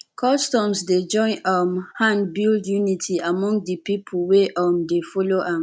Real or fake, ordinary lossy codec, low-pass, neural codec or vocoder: real; none; none; none